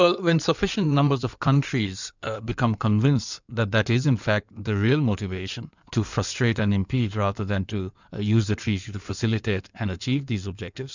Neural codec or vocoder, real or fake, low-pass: codec, 16 kHz in and 24 kHz out, 2.2 kbps, FireRedTTS-2 codec; fake; 7.2 kHz